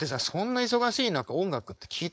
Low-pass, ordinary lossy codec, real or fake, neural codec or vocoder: none; none; fake; codec, 16 kHz, 4.8 kbps, FACodec